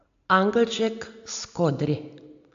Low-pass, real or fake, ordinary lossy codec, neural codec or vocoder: 7.2 kHz; real; MP3, 64 kbps; none